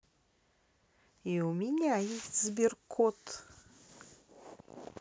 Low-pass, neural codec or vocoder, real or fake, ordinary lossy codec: none; none; real; none